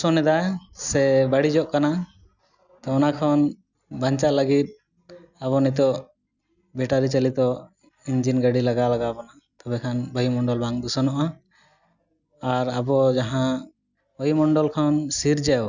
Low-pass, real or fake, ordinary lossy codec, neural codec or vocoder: 7.2 kHz; real; none; none